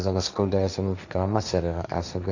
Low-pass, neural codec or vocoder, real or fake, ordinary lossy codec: 7.2 kHz; codec, 16 kHz, 1.1 kbps, Voila-Tokenizer; fake; none